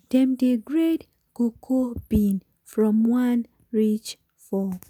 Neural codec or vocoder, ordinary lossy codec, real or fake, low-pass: none; none; real; 19.8 kHz